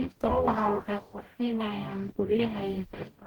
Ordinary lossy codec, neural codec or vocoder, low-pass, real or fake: Opus, 16 kbps; codec, 44.1 kHz, 0.9 kbps, DAC; 19.8 kHz; fake